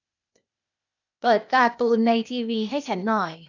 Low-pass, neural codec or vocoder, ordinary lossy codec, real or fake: 7.2 kHz; codec, 16 kHz, 0.8 kbps, ZipCodec; none; fake